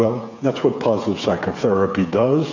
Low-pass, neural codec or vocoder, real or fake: 7.2 kHz; codec, 16 kHz, 8 kbps, FreqCodec, smaller model; fake